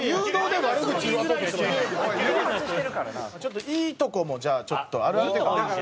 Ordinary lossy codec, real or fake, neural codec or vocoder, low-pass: none; real; none; none